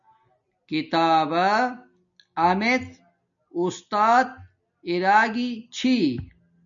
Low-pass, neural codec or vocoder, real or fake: 7.2 kHz; none; real